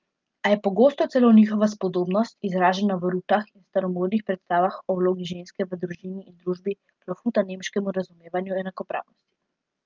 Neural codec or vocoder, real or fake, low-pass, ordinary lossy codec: none; real; 7.2 kHz; Opus, 24 kbps